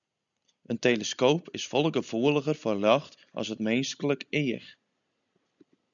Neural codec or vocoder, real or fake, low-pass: none; real; 7.2 kHz